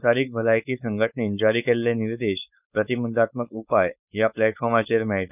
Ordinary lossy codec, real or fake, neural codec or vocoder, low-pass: none; fake; codec, 16 kHz, 4.8 kbps, FACodec; 3.6 kHz